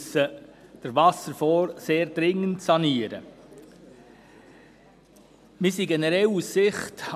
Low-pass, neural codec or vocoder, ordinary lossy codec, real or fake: 14.4 kHz; none; none; real